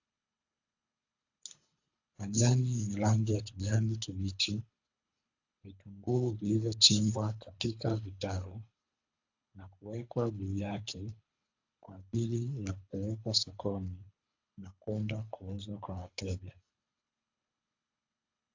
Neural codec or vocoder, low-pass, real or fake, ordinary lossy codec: codec, 24 kHz, 3 kbps, HILCodec; 7.2 kHz; fake; AAC, 48 kbps